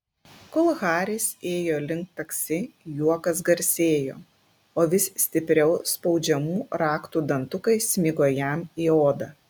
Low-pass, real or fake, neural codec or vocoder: 19.8 kHz; real; none